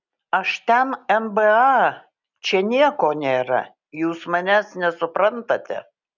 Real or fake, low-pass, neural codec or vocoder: real; 7.2 kHz; none